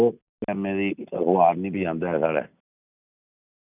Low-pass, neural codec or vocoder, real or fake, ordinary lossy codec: 3.6 kHz; none; real; none